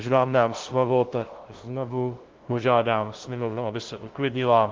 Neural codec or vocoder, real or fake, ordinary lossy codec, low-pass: codec, 16 kHz, 0.5 kbps, FunCodec, trained on LibriTTS, 25 frames a second; fake; Opus, 16 kbps; 7.2 kHz